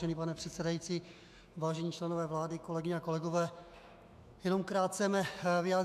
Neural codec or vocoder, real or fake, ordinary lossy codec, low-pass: autoencoder, 48 kHz, 128 numbers a frame, DAC-VAE, trained on Japanese speech; fake; AAC, 96 kbps; 14.4 kHz